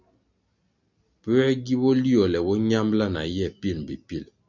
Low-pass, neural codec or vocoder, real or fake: 7.2 kHz; none; real